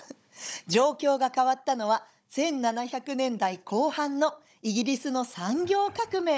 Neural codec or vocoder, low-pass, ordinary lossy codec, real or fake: codec, 16 kHz, 16 kbps, FunCodec, trained on Chinese and English, 50 frames a second; none; none; fake